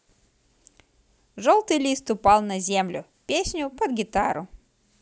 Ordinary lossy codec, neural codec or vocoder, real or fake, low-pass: none; none; real; none